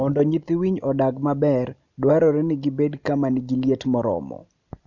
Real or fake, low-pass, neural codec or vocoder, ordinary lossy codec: real; 7.2 kHz; none; none